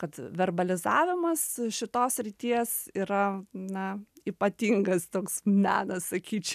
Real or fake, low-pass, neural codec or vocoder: real; 14.4 kHz; none